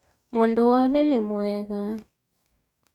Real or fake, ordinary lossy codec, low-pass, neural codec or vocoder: fake; none; 19.8 kHz; codec, 44.1 kHz, 2.6 kbps, DAC